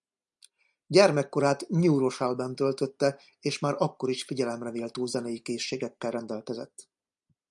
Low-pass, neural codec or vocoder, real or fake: 10.8 kHz; none; real